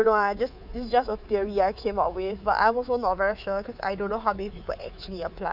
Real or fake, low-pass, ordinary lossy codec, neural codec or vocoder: fake; 5.4 kHz; none; codec, 24 kHz, 3.1 kbps, DualCodec